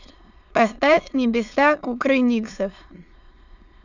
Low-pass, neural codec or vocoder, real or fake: 7.2 kHz; autoencoder, 22.05 kHz, a latent of 192 numbers a frame, VITS, trained on many speakers; fake